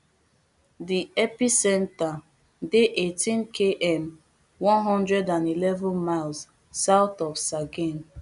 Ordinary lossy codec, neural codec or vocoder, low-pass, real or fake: none; none; 10.8 kHz; real